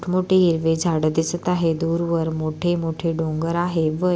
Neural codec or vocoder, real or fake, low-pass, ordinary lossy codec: none; real; none; none